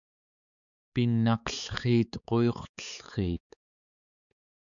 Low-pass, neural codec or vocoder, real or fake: 7.2 kHz; codec, 16 kHz, 4 kbps, X-Codec, HuBERT features, trained on balanced general audio; fake